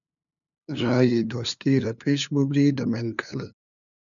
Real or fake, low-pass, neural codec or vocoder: fake; 7.2 kHz; codec, 16 kHz, 2 kbps, FunCodec, trained on LibriTTS, 25 frames a second